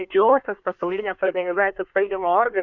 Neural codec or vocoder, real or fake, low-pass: codec, 24 kHz, 1 kbps, SNAC; fake; 7.2 kHz